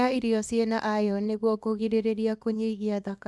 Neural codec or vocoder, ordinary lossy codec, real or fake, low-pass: codec, 24 kHz, 0.9 kbps, WavTokenizer, small release; none; fake; none